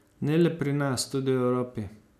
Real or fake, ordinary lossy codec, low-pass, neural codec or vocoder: real; none; 14.4 kHz; none